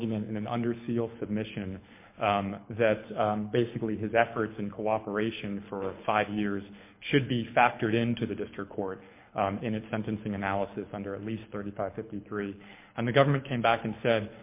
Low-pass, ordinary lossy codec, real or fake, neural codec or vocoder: 3.6 kHz; MP3, 24 kbps; fake; codec, 24 kHz, 6 kbps, HILCodec